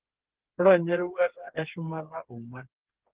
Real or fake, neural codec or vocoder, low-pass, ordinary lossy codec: fake; codec, 16 kHz, 4 kbps, FreqCodec, smaller model; 3.6 kHz; Opus, 16 kbps